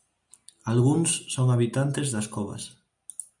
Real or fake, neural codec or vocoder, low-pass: real; none; 10.8 kHz